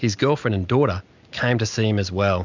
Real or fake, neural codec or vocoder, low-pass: real; none; 7.2 kHz